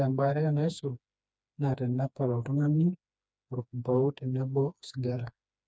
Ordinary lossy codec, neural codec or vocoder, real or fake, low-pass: none; codec, 16 kHz, 2 kbps, FreqCodec, smaller model; fake; none